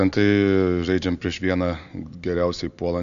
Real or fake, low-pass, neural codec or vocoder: real; 7.2 kHz; none